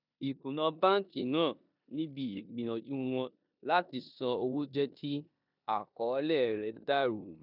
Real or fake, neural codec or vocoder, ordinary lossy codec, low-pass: fake; codec, 16 kHz in and 24 kHz out, 0.9 kbps, LongCat-Audio-Codec, four codebook decoder; none; 5.4 kHz